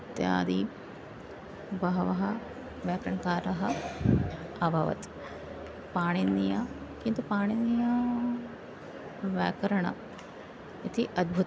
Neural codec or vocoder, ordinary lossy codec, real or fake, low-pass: none; none; real; none